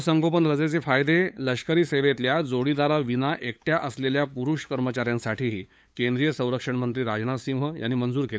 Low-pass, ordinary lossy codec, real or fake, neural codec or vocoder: none; none; fake; codec, 16 kHz, 8 kbps, FunCodec, trained on LibriTTS, 25 frames a second